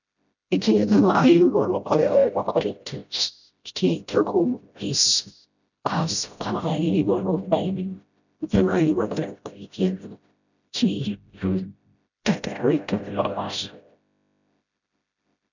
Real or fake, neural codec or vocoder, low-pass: fake; codec, 16 kHz, 0.5 kbps, FreqCodec, smaller model; 7.2 kHz